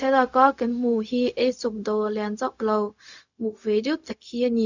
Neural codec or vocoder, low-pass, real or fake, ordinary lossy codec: codec, 16 kHz, 0.4 kbps, LongCat-Audio-Codec; 7.2 kHz; fake; none